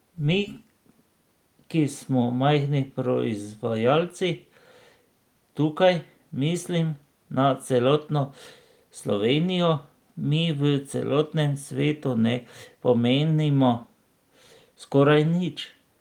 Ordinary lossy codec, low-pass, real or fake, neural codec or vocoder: Opus, 24 kbps; 19.8 kHz; real; none